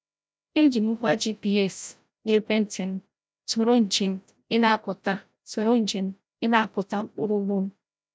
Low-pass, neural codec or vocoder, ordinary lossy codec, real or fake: none; codec, 16 kHz, 0.5 kbps, FreqCodec, larger model; none; fake